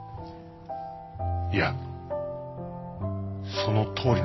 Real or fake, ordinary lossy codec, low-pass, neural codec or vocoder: real; MP3, 24 kbps; 7.2 kHz; none